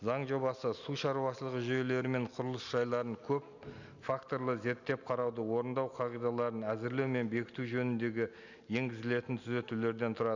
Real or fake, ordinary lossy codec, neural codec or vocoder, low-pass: real; none; none; 7.2 kHz